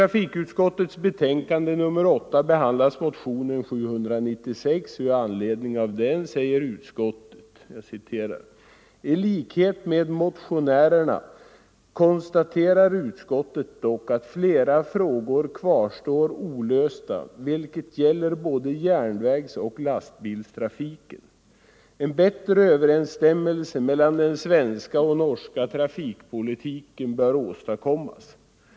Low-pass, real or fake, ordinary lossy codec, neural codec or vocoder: none; real; none; none